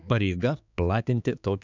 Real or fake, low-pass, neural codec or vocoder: fake; 7.2 kHz; codec, 16 kHz, 4 kbps, X-Codec, HuBERT features, trained on balanced general audio